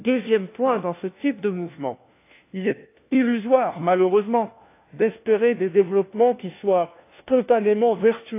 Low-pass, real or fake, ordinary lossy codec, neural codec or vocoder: 3.6 kHz; fake; AAC, 24 kbps; codec, 16 kHz, 1 kbps, FunCodec, trained on LibriTTS, 50 frames a second